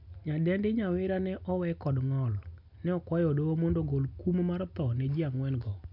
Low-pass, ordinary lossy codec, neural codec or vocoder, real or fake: 5.4 kHz; none; none; real